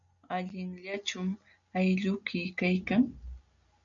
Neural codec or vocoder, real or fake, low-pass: none; real; 7.2 kHz